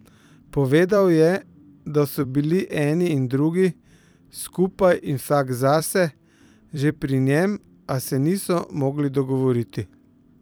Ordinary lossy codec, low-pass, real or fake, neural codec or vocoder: none; none; fake; vocoder, 44.1 kHz, 128 mel bands every 256 samples, BigVGAN v2